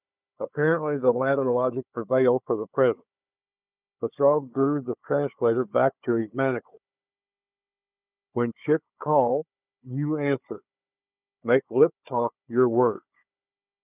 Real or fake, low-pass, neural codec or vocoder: fake; 3.6 kHz; codec, 16 kHz, 4 kbps, FunCodec, trained on Chinese and English, 50 frames a second